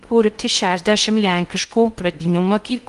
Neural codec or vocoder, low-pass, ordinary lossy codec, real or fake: codec, 16 kHz in and 24 kHz out, 0.6 kbps, FocalCodec, streaming, 2048 codes; 10.8 kHz; Opus, 24 kbps; fake